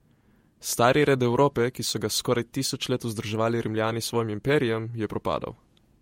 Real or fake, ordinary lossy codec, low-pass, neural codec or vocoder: fake; MP3, 64 kbps; 19.8 kHz; vocoder, 48 kHz, 128 mel bands, Vocos